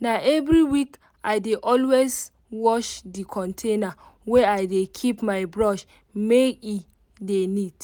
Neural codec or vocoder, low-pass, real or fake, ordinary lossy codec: none; none; real; none